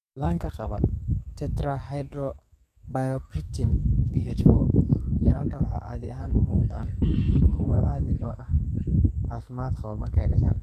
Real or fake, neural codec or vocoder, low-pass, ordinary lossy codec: fake; codec, 44.1 kHz, 2.6 kbps, SNAC; 14.4 kHz; none